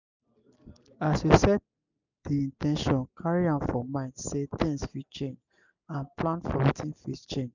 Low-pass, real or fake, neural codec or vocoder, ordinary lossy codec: 7.2 kHz; real; none; none